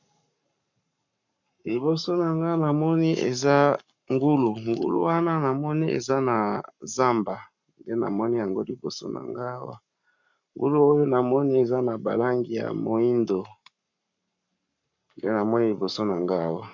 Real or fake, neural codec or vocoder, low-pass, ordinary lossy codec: fake; codec, 44.1 kHz, 7.8 kbps, Pupu-Codec; 7.2 kHz; MP3, 64 kbps